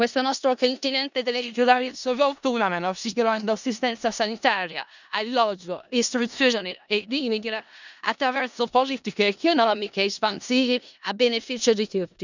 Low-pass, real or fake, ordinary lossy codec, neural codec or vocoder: 7.2 kHz; fake; none; codec, 16 kHz in and 24 kHz out, 0.4 kbps, LongCat-Audio-Codec, four codebook decoder